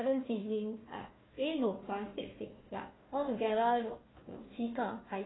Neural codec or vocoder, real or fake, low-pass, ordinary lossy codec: codec, 16 kHz, 1 kbps, FunCodec, trained on Chinese and English, 50 frames a second; fake; 7.2 kHz; AAC, 16 kbps